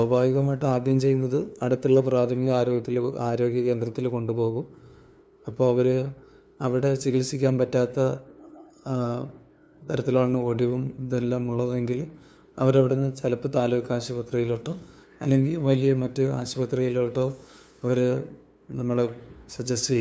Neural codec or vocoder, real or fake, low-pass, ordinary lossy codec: codec, 16 kHz, 2 kbps, FunCodec, trained on LibriTTS, 25 frames a second; fake; none; none